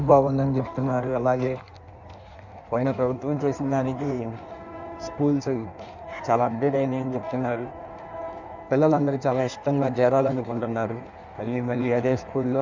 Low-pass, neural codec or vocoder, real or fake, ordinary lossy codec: 7.2 kHz; codec, 16 kHz in and 24 kHz out, 1.1 kbps, FireRedTTS-2 codec; fake; none